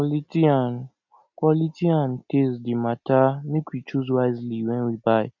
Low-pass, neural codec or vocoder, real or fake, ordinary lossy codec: 7.2 kHz; none; real; none